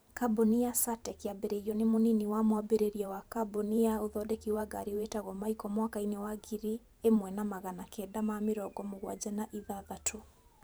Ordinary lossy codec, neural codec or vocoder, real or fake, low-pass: none; vocoder, 44.1 kHz, 128 mel bands every 512 samples, BigVGAN v2; fake; none